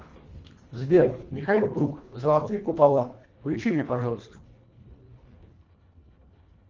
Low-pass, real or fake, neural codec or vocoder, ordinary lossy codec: 7.2 kHz; fake; codec, 24 kHz, 1.5 kbps, HILCodec; Opus, 32 kbps